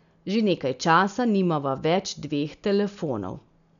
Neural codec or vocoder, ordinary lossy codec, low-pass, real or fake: none; none; 7.2 kHz; real